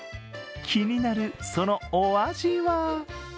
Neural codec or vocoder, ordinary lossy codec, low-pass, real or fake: none; none; none; real